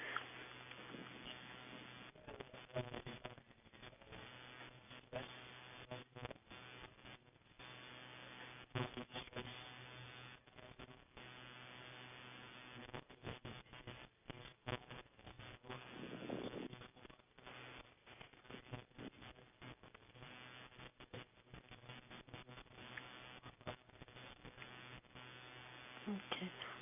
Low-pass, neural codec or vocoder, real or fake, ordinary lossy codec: 3.6 kHz; none; real; none